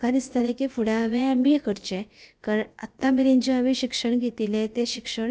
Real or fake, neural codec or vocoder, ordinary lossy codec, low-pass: fake; codec, 16 kHz, about 1 kbps, DyCAST, with the encoder's durations; none; none